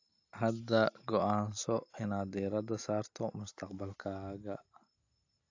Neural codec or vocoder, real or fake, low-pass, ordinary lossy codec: none; real; 7.2 kHz; AAC, 48 kbps